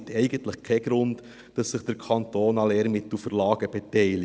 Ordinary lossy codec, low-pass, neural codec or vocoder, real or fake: none; none; none; real